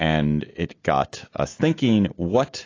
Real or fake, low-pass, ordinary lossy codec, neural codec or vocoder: real; 7.2 kHz; AAC, 32 kbps; none